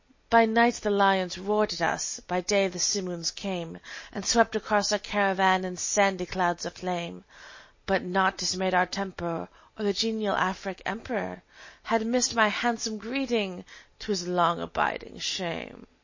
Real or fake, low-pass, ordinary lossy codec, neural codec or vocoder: real; 7.2 kHz; MP3, 32 kbps; none